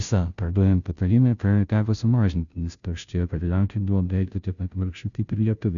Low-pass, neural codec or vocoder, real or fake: 7.2 kHz; codec, 16 kHz, 0.5 kbps, FunCodec, trained on Chinese and English, 25 frames a second; fake